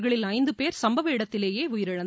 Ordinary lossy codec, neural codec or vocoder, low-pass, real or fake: none; none; none; real